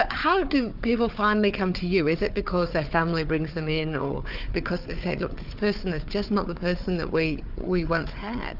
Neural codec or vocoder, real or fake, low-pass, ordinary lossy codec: codec, 16 kHz, 4 kbps, FunCodec, trained on Chinese and English, 50 frames a second; fake; 5.4 kHz; Opus, 64 kbps